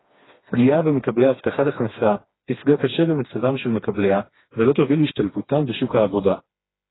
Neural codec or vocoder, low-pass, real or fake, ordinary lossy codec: codec, 16 kHz, 2 kbps, FreqCodec, smaller model; 7.2 kHz; fake; AAC, 16 kbps